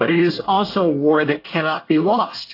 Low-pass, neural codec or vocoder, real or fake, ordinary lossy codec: 5.4 kHz; codec, 24 kHz, 1 kbps, SNAC; fake; AAC, 32 kbps